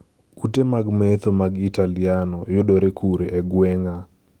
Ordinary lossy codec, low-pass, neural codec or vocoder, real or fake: Opus, 24 kbps; 19.8 kHz; autoencoder, 48 kHz, 128 numbers a frame, DAC-VAE, trained on Japanese speech; fake